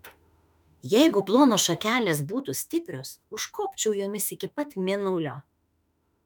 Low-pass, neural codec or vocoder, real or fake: 19.8 kHz; autoencoder, 48 kHz, 32 numbers a frame, DAC-VAE, trained on Japanese speech; fake